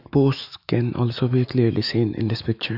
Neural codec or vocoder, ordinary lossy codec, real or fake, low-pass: autoencoder, 48 kHz, 128 numbers a frame, DAC-VAE, trained on Japanese speech; none; fake; 5.4 kHz